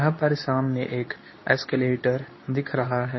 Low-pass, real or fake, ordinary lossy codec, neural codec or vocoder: 7.2 kHz; fake; MP3, 24 kbps; codec, 24 kHz, 0.9 kbps, WavTokenizer, medium speech release version 2